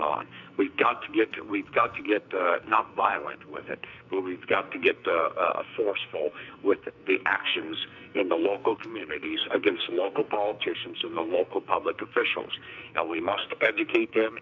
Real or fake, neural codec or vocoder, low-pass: fake; codec, 44.1 kHz, 2.6 kbps, SNAC; 7.2 kHz